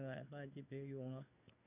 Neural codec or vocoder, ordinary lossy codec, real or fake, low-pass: codec, 16 kHz in and 24 kHz out, 1 kbps, XY-Tokenizer; none; fake; 3.6 kHz